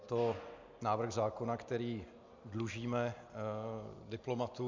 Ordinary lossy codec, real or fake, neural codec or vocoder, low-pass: MP3, 64 kbps; real; none; 7.2 kHz